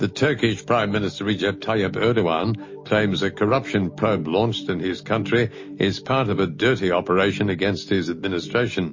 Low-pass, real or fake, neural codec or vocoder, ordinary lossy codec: 7.2 kHz; real; none; MP3, 32 kbps